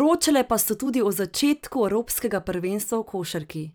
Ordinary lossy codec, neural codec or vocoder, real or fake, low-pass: none; vocoder, 44.1 kHz, 128 mel bands every 256 samples, BigVGAN v2; fake; none